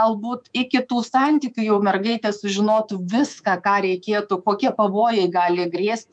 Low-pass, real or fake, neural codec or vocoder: 9.9 kHz; fake; codec, 24 kHz, 3.1 kbps, DualCodec